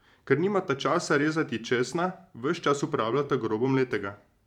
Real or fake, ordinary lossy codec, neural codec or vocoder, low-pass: fake; none; vocoder, 44.1 kHz, 128 mel bands every 512 samples, BigVGAN v2; 19.8 kHz